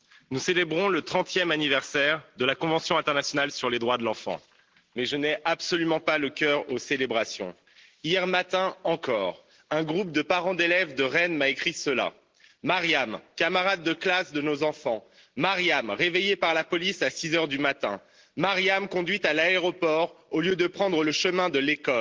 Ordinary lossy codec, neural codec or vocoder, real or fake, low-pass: Opus, 16 kbps; none; real; 7.2 kHz